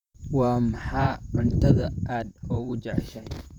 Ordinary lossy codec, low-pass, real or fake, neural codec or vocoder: none; 19.8 kHz; fake; vocoder, 44.1 kHz, 128 mel bands, Pupu-Vocoder